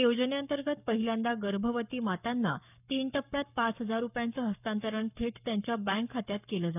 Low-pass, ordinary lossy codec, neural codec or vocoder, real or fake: 3.6 kHz; none; codec, 44.1 kHz, 7.8 kbps, Pupu-Codec; fake